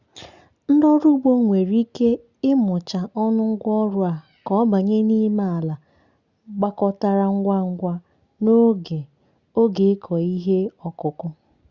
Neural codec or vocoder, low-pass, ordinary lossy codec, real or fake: none; 7.2 kHz; Opus, 64 kbps; real